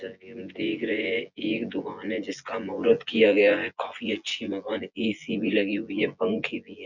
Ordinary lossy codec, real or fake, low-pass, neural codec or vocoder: none; fake; 7.2 kHz; vocoder, 24 kHz, 100 mel bands, Vocos